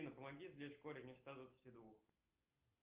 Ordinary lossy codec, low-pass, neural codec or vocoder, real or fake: Opus, 32 kbps; 3.6 kHz; none; real